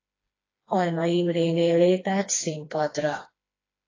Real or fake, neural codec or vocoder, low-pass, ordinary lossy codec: fake; codec, 16 kHz, 2 kbps, FreqCodec, smaller model; 7.2 kHz; AAC, 32 kbps